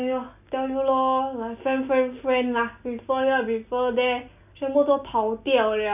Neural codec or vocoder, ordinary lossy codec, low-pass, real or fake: none; none; 3.6 kHz; real